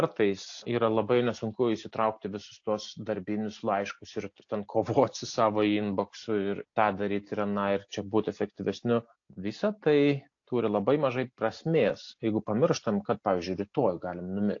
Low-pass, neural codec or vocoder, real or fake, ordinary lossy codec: 7.2 kHz; none; real; AAC, 48 kbps